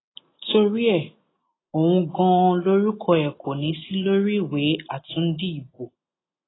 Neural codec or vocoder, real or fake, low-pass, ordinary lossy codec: none; real; 7.2 kHz; AAC, 16 kbps